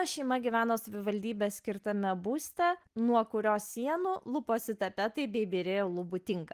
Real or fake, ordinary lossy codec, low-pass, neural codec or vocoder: real; Opus, 24 kbps; 14.4 kHz; none